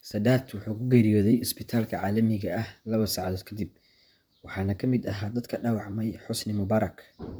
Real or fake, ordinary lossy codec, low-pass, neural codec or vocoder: fake; none; none; vocoder, 44.1 kHz, 128 mel bands, Pupu-Vocoder